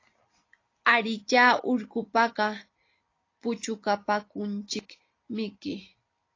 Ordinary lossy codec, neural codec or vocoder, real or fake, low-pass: AAC, 32 kbps; none; real; 7.2 kHz